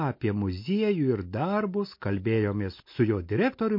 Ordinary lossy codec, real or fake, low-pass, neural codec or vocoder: MP3, 32 kbps; real; 5.4 kHz; none